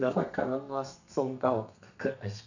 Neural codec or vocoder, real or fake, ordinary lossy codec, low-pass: codec, 32 kHz, 1.9 kbps, SNAC; fake; none; 7.2 kHz